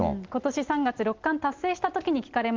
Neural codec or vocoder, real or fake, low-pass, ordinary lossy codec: none; real; 7.2 kHz; Opus, 24 kbps